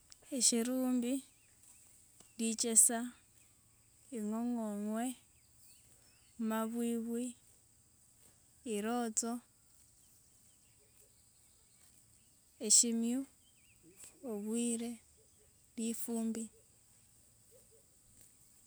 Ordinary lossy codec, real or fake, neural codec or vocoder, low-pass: none; real; none; none